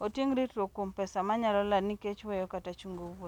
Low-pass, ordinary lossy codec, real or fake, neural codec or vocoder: 19.8 kHz; none; real; none